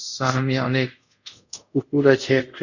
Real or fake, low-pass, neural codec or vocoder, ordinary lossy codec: fake; 7.2 kHz; codec, 24 kHz, 0.5 kbps, DualCodec; none